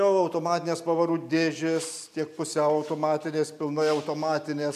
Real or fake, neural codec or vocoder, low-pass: real; none; 14.4 kHz